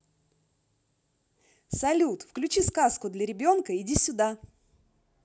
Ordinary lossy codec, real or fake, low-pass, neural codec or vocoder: none; real; none; none